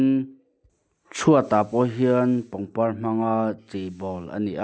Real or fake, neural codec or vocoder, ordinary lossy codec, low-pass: real; none; none; none